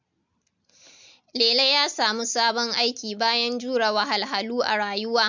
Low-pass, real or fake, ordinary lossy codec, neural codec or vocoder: 7.2 kHz; real; MP3, 64 kbps; none